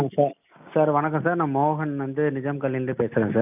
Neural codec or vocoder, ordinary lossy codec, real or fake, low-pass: none; none; real; 3.6 kHz